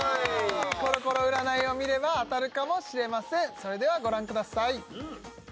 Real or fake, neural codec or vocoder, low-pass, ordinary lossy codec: real; none; none; none